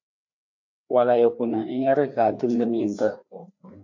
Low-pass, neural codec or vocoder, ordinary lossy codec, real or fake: 7.2 kHz; codec, 16 kHz, 2 kbps, FreqCodec, larger model; MP3, 48 kbps; fake